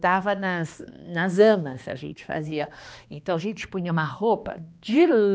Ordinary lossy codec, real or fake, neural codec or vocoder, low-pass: none; fake; codec, 16 kHz, 2 kbps, X-Codec, HuBERT features, trained on balanced general audio; none